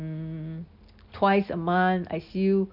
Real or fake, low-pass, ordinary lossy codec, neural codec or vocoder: real; 5.4 kHz; none; none